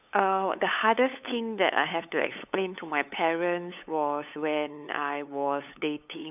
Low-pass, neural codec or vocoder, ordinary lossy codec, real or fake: 3.6 kHz; codec, 16 kHz, 8 kbps, FunCodec, trained on LibriTTS, 25 frames a second; none; fake